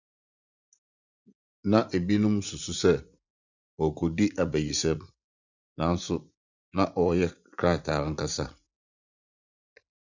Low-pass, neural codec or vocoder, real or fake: 7.2 kHz; vocoder, 44.1 kHz, 80 mel bands, Vocos; fake